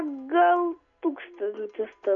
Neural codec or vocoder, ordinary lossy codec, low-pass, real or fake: none; MP3, 64 kbps; 7.2 kHz; real